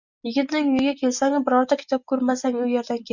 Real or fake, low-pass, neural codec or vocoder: real; 7.2 kHz; none